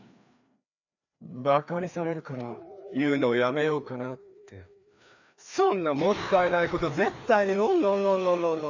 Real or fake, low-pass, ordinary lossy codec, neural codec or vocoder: fake; 7.2 kHz; none; codec, 16 kHz, 2 kbps, FreqCodec, larger model